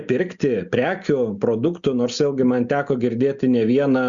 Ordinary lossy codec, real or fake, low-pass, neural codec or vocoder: Opus, 64 kbps; real; 7.2 kHz; none